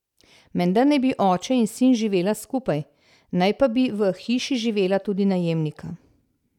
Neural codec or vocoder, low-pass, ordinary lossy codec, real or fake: none; 19.8 kHz; none; real